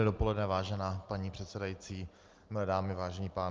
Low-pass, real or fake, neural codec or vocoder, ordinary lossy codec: 7.2 kHz; real; none; Opus, 24 kbps